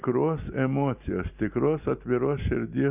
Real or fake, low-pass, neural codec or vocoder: real; 3.6 kHz; none